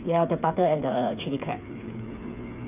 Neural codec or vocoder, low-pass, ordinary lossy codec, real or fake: codec, 16 kHz, 8 kbps, FreqCodec, smaller model; 3.6 kHz; none; fake